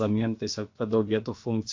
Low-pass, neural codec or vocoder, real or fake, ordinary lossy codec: 7.2 kHz; codec, 16 kHz, about 1 kbps, DyCAST, with the encoder's durations; fake; MP3, 48 kbps